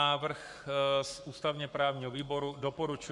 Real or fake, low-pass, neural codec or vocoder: fake; 10.8 kHz; codec, 44.1 kHz, 7.8 kbps, Pupu-Codec